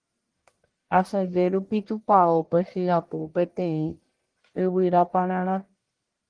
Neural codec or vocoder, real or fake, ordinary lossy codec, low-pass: codec, 44.1 kHz, 1.7 kbps, Pupu-Codec; fake; Opus, 24 kbps; 9.9 kHz